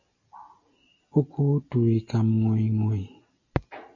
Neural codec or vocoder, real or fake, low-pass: none; real; 7.2 kHz